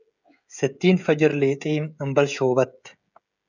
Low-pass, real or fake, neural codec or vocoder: 7.2 kHz; fake; codec, 16 kHz, 16 kbps, FreqCodec, smaller model